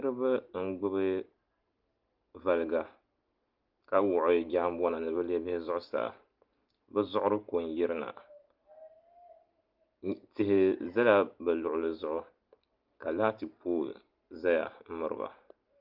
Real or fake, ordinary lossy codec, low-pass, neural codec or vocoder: real; Opus, 32 kbps; 5.4 kHz; none